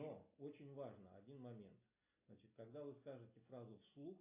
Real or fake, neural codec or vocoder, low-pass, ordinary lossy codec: real; none; 3.6 kHz; AAC, 32 kbps